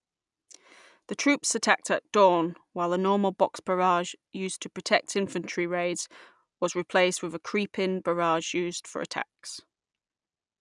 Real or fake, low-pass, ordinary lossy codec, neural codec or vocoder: real; 10.8 kHz; none; none